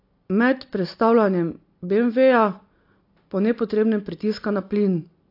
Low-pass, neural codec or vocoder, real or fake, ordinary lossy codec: 5.4 kHz; none; real; AAC, 32 kbps